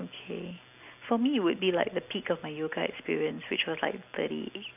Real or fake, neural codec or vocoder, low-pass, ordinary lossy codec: real; none; 3.6 kHz; none